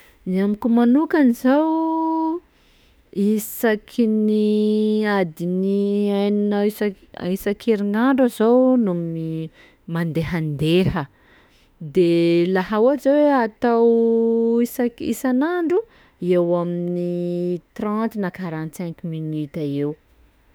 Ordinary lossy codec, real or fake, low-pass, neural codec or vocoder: none; fake; none; autoencoder, 48 kHz, 32 numbers a frame, DAC-VAE, trained on Japanese speech